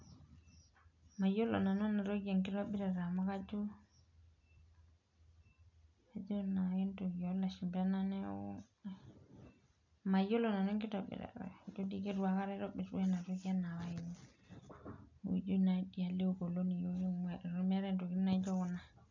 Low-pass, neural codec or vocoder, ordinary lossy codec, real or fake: 7.2 kHz; none; none; real